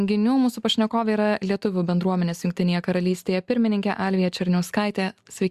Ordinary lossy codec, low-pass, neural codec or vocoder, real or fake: Opus, 64 kbps; 14.4 kHz; none; real